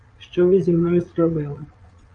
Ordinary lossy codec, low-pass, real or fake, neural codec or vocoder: AAC, 64 kbps; 9.9 kHz; fake; vocoder, 22.05 kHz, 80 mel bands, Vocos